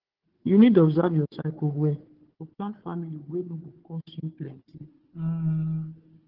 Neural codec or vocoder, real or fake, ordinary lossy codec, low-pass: codec, 16 kHz, 4 kbps, FunCodec, trained on Chinese and English, 50 frames a second; fake; Opus, 16 kbps; 5.4 kHz